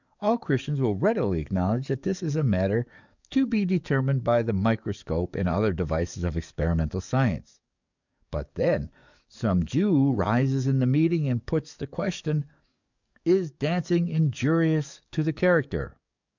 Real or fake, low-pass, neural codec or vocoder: fake; 7.2 kHz; codec, 44.1 kHz, 7.8 kbps, DAC